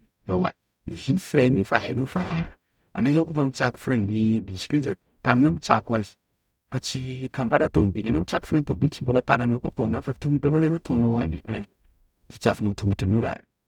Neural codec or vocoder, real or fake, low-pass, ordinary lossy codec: codec, 44.1 kHz, 0.9 kbps, DAC; fake; 19.8 kHz; none